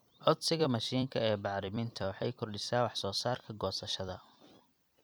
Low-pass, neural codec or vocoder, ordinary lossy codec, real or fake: none; vocoder, 44.1 kHz, 128 mel bands every 256 samples, BigVGAN v2; none; fake